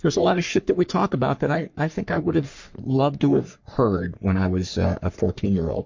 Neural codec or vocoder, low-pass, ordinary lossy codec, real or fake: codec, 44.1 kHz, 3.4 kbps, Pupu-Codec; 7.2 kHz; MP3, 48 kbps; fake